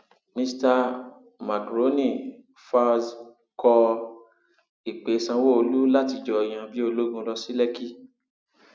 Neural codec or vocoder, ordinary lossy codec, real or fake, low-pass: none; none; real; none